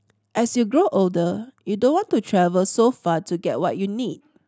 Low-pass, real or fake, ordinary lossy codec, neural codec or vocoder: none; real; none; none